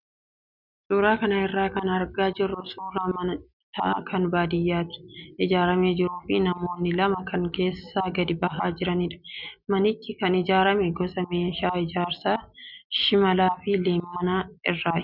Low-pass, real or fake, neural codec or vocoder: 5.4 kHz; real; none